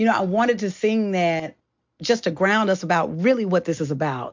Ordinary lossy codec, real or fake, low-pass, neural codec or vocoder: MP3, 48 kbps; real; 7.2 kHz; none